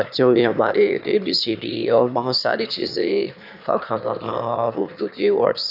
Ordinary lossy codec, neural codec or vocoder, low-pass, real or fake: none; autoencoder, 22.05 kHz, a latent of 192 numbers a frame, VITS, trained on one speaker; 5.4 kHz; fake